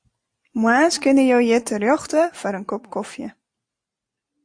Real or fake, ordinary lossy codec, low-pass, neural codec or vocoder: real; AAC, 64 kbps; 9.9 kHz; none